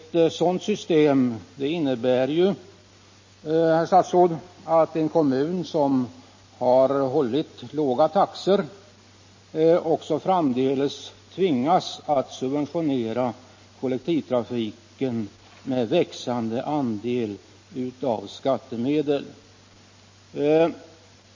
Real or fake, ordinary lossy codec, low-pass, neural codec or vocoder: real; MP3, 32 kbps; 7.2 kHz; none